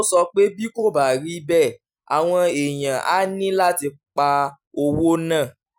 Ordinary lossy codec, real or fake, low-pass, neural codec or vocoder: none; real; none; none